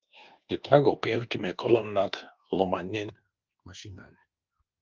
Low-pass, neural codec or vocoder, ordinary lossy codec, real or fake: 7.2 kHz; codec, 24 kHz, 1.2 kbps, DualCodec; Opus, 32 kbps; fake